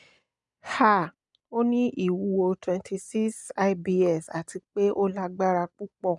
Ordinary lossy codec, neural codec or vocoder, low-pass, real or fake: none; none; 10.8 kHz; real